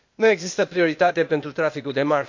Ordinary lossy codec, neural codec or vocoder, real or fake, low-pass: MP3, 48 kbps; codec, 16 kHz, 0.8 kbps, ZipCodec; fake; 7.2 kHz